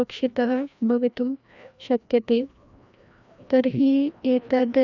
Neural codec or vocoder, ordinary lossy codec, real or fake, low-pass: codec, 16 kHz, 1 kbps, FreqCodec, larger model; none; fake; 7.2 kHz